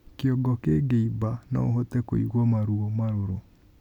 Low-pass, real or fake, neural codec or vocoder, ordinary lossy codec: 19.8 kHz; real; none; none